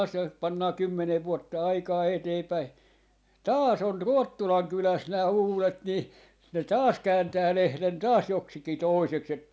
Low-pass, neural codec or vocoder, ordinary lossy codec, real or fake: none; none; none; real